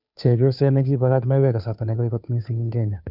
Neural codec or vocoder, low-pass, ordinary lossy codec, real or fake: codec, 16 kHz, 2 kbps, FunCodec, trained on Chinese and English, 25 frames a second; 5.4 kHz; none; fake